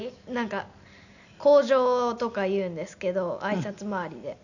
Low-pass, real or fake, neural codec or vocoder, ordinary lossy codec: 7.2 kHz; real; none; none